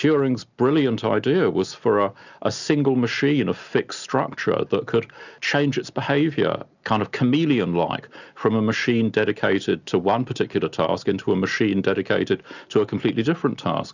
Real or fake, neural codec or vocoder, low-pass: real; none; 7.2 kHz